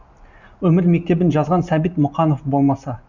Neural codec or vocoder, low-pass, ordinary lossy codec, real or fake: none; 7.2 kHz; none; real